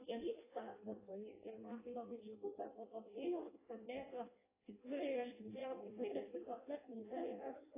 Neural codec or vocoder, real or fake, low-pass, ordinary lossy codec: codec, 16 kHz in and 24 kHz out, 0.6 kbps, FireRedTTS-2 codec; fake; 3.6 kHz; AAC, 16 kbps